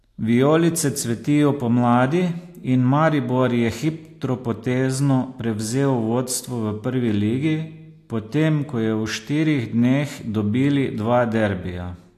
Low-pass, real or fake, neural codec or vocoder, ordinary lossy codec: 14.4 kHz; real; none; AAC, 64 kbps